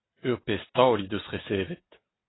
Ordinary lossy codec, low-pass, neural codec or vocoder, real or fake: AAC, 16 kbps; 7.2 kHz; none; real